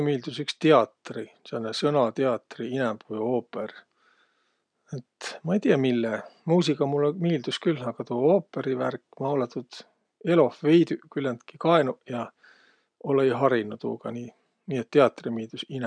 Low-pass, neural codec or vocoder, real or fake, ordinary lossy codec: 9.9 kHz; none; real; none